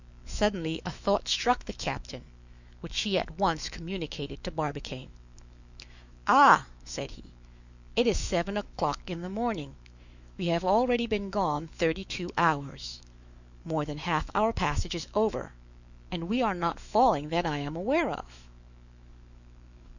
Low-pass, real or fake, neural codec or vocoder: 7.2 kHz; fake; autoencoder, 48 kHz, 128 numbers a frame, DAC-VAE, trained on Japanese speech